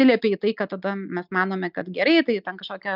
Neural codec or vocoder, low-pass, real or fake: none; 5.4 kHz; real